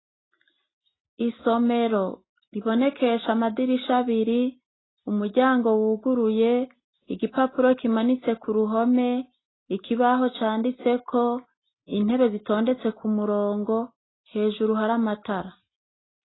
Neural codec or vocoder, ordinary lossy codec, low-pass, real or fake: none; AAC, 16 kbps; 7.2 kHz; real